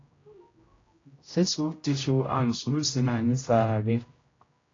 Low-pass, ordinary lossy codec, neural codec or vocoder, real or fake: 7.2 kHz; AAC, 32 kbps; codec, 16 kHz, 0.5 kbps, X-Codec, HuBERT features, trained on general audio; fake